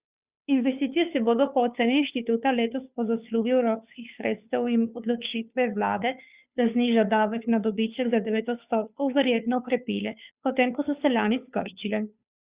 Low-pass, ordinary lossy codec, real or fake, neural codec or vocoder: 3.6 kHz; Opus, 64 kbps; fake; codec, 16 kHz, 2 kbps, FunCodec, trained on Chinese and English, 25 frames a second